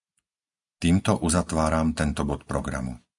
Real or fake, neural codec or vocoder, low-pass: real; none; 10.8 kHz